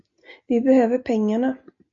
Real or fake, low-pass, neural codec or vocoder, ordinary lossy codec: real; 7.2 kHz; none; AAC, 64 kbps